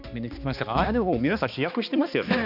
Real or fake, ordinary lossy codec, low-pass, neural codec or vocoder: fake; AAC, 48 kbps; 5.4 kHz; codec, 16 kHz, 2 kbps, X-Codec, HuBERT features, trained on balanced general audio